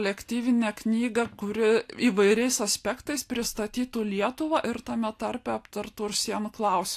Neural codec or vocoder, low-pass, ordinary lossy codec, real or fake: none; 14.4 kHz; AAC, 64 kbps; real